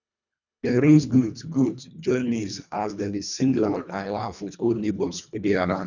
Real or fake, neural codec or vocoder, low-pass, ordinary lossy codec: fake; codec, 24 kHz, 1.5 kbps, HILCodec; 7.2 kHz; none